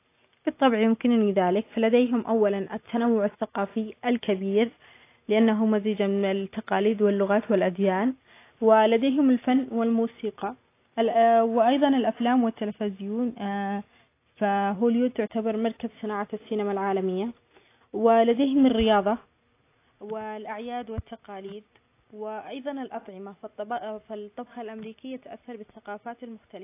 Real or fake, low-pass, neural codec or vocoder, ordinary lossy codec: real; 3.6 kHz; none; AAC, 24 kbps